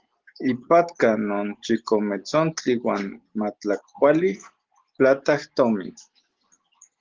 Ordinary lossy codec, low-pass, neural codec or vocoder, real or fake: Opus, 16 kbps; 7.2 kHz; none; real